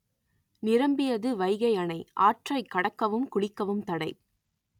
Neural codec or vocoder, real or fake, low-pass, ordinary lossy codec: none; real; 19.8 kHz; none